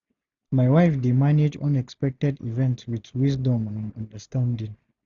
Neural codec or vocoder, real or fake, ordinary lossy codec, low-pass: none; real; none; 7.2 kHz